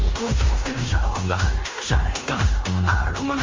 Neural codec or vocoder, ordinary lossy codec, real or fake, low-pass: codec, 16 kHz in and 24 kHz out, 0.9 kbps, LongCat-Audio-Codec, fine tuned four codebook decoder; Opus, 32 kbps; fake; 7.2 kHz